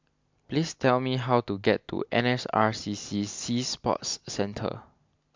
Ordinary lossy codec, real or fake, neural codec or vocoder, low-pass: MP3, 64 kbps; real; none; 7.2 kHz